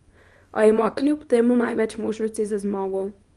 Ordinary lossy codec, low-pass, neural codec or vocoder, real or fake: Opus, 32 kbps; 10.8 kHz; codec, 24 kHz, 0.9 kbps, WavTokenizer, small release; fake